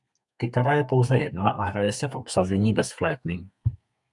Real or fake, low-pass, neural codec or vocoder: fake; 10.8 kHz; codec, 32 kHz, 1.9 kbps, SNAC